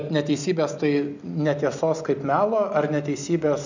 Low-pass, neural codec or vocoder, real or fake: 7.2 kHz; none; real